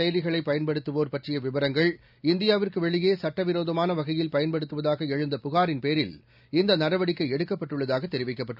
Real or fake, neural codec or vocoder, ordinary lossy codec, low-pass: real; none; none; 5.4 kHz